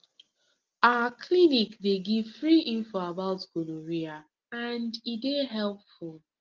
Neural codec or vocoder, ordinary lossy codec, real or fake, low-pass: none; Opus, 32 kbps; real; 7.2 kHz